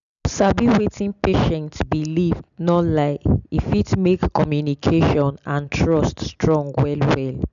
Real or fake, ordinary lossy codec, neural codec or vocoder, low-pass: real; none; none; 7.2 kHz